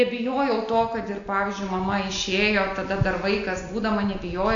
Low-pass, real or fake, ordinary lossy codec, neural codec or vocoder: 7.2 kHz; real; AAC, 48 kbps; none